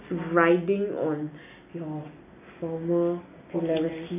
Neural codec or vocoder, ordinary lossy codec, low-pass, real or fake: none; none; 3.6 kHz; real